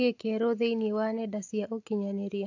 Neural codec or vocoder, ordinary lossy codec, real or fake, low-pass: none; AAC, 48 kbps; real; 7.2 kHz